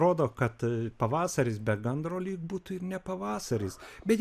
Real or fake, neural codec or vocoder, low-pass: real; none; 14.4 kHz